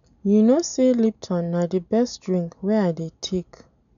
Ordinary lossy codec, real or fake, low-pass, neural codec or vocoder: none; real; 7.2 kHz; none